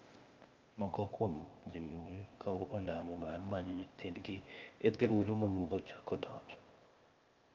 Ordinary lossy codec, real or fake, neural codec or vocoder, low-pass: Opus, 24 kbps; fake; codec, 16 kHz, 0.8 kbps, ZipCodec; 7.2 kHz